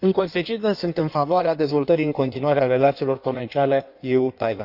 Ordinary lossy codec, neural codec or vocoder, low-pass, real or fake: none; codec, 16 kHz in and 24 kHz out, 1.1 kbps, FireRedTTS-2 codec; 5.4 kHz; fake